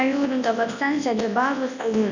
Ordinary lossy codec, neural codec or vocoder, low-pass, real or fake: none; codec, 24 kHz, 0.9 kbps, WavTokenizer, large speech release; 7.2 kHz; fake